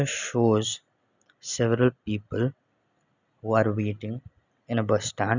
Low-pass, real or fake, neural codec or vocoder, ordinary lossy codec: 7.2 kHz; real; none; none